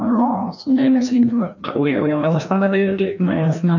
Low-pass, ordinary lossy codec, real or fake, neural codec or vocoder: 7.2 kHz; none; fake; codec, 16 kHz, 1 kbps, FreqCodec, larger model